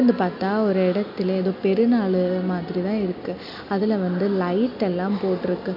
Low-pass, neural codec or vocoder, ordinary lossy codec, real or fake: 5.4 kHz; none; none; real